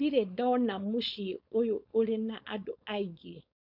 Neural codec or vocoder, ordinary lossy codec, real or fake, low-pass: codec, 16 kHz, 4.8 kbps, FACodec; none; fake; 5.4 kHz